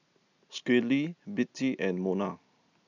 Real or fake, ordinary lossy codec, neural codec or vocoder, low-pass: real; none; none; 7.2 kHz